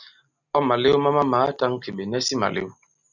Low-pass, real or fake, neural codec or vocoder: 7.2 kHz; real; none